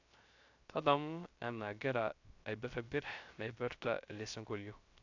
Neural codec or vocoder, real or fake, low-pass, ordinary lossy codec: codec, 16 kHz, 0.3 kbps, FocalCodec; fake; 7.2 kHz; AAC, 48 kbps